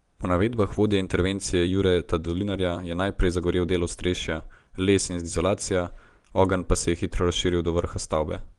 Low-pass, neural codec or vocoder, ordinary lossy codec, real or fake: 10.8 kHz; vocoder, 24 kHz, 100 mel bands, Vocos; Opus, 24 kbps; fake